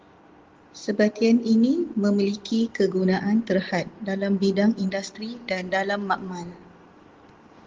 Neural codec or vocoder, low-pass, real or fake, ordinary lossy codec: none; 7.2 kHz; real; Opus, 16 kbps